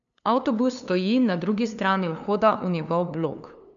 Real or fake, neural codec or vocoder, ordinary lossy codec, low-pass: fake; codec, 16 kHz, 2 kbps, FunCodec, trained on LibriTTS, 25 frames a second; none; 7.2 kHz